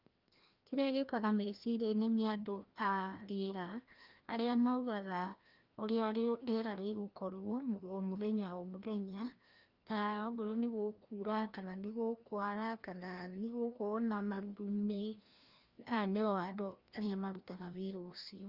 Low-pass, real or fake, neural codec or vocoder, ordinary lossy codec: 5.4 kHz; fake; codec, 16 kHz, 1 kbps, FreqCodec, larger model; Opus, 32 kbps